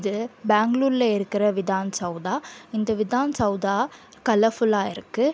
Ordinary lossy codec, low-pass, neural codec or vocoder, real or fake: none; none; none; real